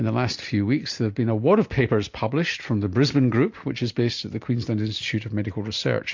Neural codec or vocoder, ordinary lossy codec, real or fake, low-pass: none; MP3, 48 kbps; real; 7.2 kHz